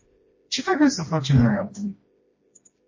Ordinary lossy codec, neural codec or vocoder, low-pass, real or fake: MP3, 32 kbps; codec, 16 kHz, 1 kbps, FreqCodec, smaller model; 7.2 kHz; fake